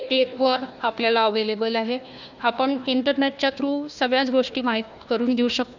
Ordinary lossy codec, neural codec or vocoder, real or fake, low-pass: none; codec, 16 kHz, 1 kbps, FunCodec, trained on Chinese and English, 50 frames a second; fake; 7.2 kHz